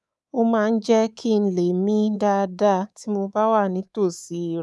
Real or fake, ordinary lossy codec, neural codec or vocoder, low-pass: fake; none; codec, 24 kHz, 3.1 kbps, DualCodec; none